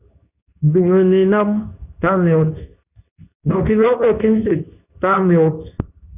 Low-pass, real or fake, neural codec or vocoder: 3.6 kHz; fake; codec, 24 kHz, 0.9 kbps, WavTokenizer, medium speech release version 2